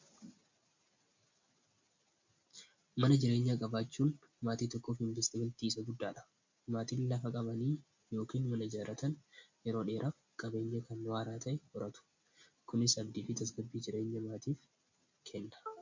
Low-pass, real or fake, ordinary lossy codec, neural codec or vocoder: 7.2 kHz; real; MP3, 48 kbps; none